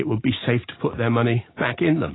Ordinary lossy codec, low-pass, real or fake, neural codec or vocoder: AAC, 16 kbps; 7.2 kHz; real; none